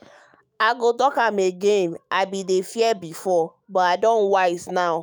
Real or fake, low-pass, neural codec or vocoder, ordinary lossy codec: fake; none; autoencoder, 48 kHz, 128 numbers a frame, DAC-VAE, trained on Japanese speech; none